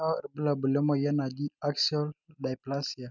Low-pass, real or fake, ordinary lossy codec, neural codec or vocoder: 7.2 kHz; real; none; none